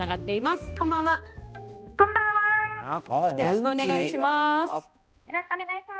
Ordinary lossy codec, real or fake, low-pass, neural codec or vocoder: none; fake; none; codec, 16 kHz, 1 kbps, X-Codec, HuBERT features, trained on balanced general audio